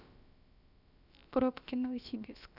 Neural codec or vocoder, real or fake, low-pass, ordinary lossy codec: codec, 16 kHz, about 1 kbps, DyCAST, with the encoder's durations; fake; 5.4 kHz; none